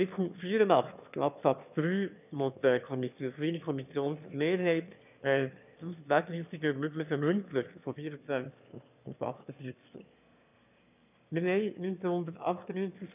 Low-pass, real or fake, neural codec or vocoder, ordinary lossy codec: 3.6 kHz; fake; autoencoder, 22.05 kHz, a latent of 192 numbers a frame, VITS, trained on one speaker; none